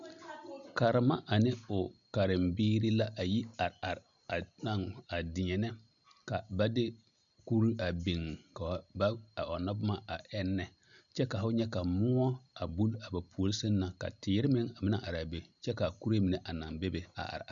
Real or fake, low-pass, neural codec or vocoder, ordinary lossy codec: real; 7.2 kHz; none; MP3, 96 kbps